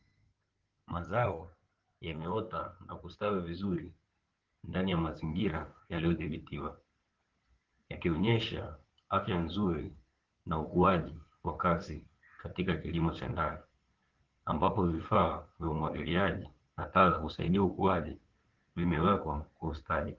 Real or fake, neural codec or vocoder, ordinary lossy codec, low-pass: fake; codec, 16 kHz in and 24 kHz out, 2.2 kbps, FireRedTTS-2 codec; Opus, 16 kbps; 7.2 kHz